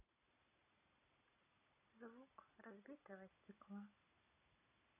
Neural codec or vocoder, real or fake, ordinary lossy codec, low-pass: none; real; none; 3.6 kHz